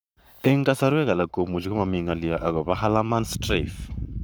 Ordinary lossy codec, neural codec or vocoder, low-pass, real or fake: none; codec, 44.1 kHz, 7.8 kbps, Pupu-Codec; none; fake